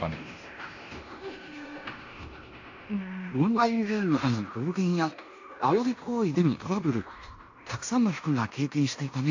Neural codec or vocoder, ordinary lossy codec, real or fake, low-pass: codec, 16 kHz in and 24 kHz out, 0.9 kbps, LongCat-Audio-Codec, fine tuned four codebook decoder; AAC, 48 kbps; fake; 7.2 kHz